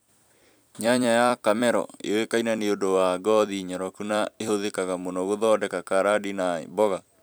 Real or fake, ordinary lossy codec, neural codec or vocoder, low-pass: fake; none; vocoder, 44.1 kHz, 128 mel bands every 256 samples, BigVGAN v2; none